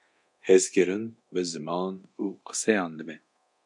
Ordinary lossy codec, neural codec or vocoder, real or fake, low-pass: MP3, 64 kbps; codec, 24 kHz, 0.9 kbps, DualCodec; fake; 10.8 kHz